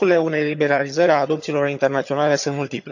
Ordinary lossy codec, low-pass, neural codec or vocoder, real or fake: none; 7.2 kHz; vocoder, 22.05 kHz, 80 mel bands, HiFi-GAN; fake